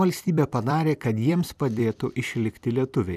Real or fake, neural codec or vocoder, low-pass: fake; vocoder, 44.1 kHz, 128 mel bands every 256 samples, BigVGAN v2; 14.4 kHz